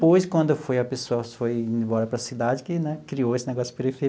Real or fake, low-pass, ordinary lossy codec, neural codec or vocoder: real; none; none; none